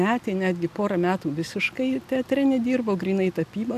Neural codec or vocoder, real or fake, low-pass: none; real; 14.4 kHz